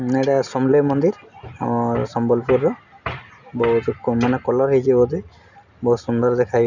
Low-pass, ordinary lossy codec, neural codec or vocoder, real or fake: 7.2 kHz; none; none; real